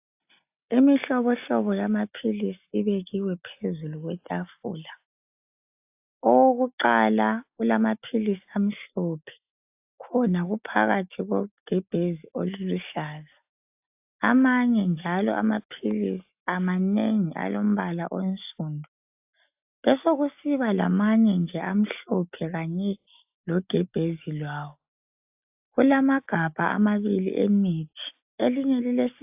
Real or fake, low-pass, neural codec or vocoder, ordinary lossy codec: real; 3.6 kHz; none; AAC, 32 kbps